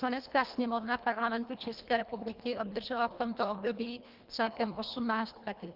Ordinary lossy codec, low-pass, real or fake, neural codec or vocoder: Opus, 32 kbps; 5.4 kHz; fake; codec, 24 kHz, 1.5 kbps, HILCodec